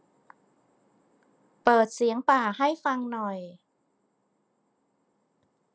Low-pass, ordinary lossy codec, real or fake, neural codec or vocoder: none; none; real; none